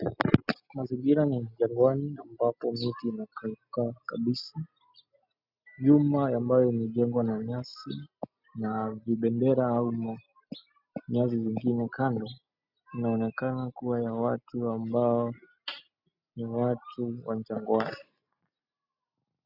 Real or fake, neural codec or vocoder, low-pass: real; none; 5.4 kHz